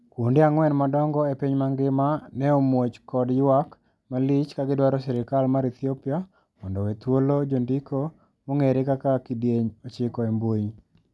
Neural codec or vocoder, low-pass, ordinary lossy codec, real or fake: none; none; none; real